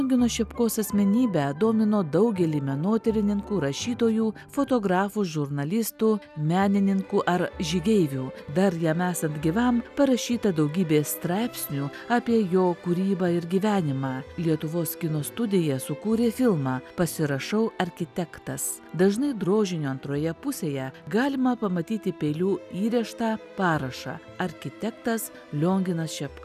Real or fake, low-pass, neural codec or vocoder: real; 14.4 kHz; none